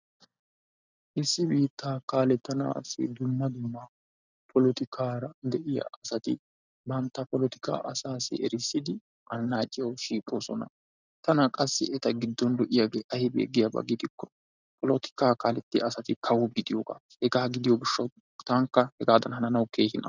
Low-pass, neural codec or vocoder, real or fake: 7.2 kHz; none; real